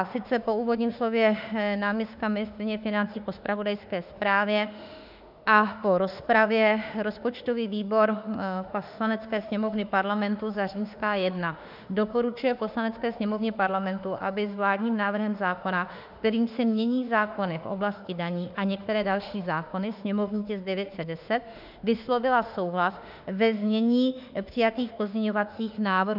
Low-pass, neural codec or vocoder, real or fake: 5.4 kHz; autoencoder, 48 kHz, 32 numbers a frame, DAC-VAE, trained on Japanese speech; fake